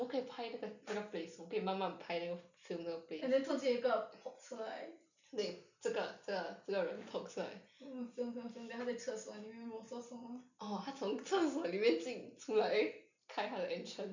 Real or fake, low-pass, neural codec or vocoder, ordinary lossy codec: real; 7.2 kHz; none; none